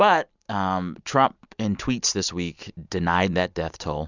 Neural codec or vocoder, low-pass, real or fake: none; 7.2 kHz; real